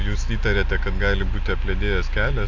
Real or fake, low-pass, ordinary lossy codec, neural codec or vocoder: real; 7.2 kHz; AAC, 48 kbps; none